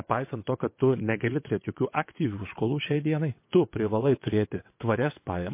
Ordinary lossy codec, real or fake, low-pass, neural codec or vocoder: MP3, 24 kbps; fake; 3.6 kHz; vocoder, 22.05 kHz, 80 mel bands, WaveNeXt